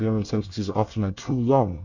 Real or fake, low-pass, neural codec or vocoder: fake; 7.2 kHz; codec, 24 kHz, 1 kbps, SNAC